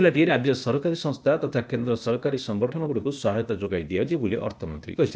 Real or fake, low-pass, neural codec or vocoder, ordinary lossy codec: fake; none; codec, 16 kHz, 0.8 kbps, ZipCodec; none